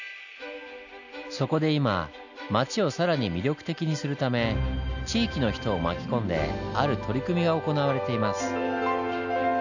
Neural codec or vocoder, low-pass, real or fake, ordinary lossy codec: none; 7.2 kHz; real; none